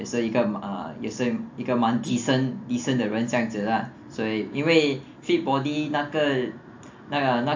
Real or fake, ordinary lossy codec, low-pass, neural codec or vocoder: real; AAC, 48 kbps; 7.2 kHz; none